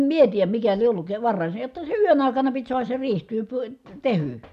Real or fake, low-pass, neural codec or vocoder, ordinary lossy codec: real; 14.4 kHz; none; none